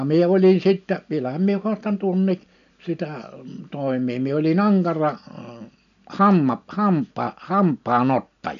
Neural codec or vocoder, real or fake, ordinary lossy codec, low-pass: none; real; none; 7.2 kHz